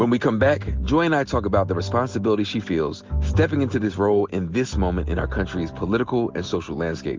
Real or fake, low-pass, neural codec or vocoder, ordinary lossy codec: real; 7.2 kHz; none; Opus, 32 kbps